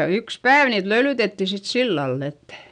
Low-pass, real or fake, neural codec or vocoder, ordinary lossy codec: 9.9 kHz; real; none; none